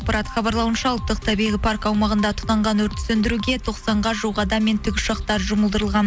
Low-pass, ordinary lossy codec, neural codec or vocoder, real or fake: none; none; none; real